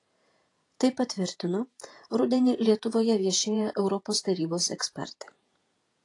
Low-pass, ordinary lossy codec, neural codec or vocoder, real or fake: 10.8 kHz; AAC, 48 kbps; vocoder, 48 kHz, 128 mel bands, Vocos; fake